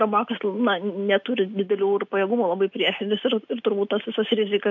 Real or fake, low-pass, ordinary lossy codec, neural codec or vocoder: real; 7.2 kHz; MP3, 64 kbps; none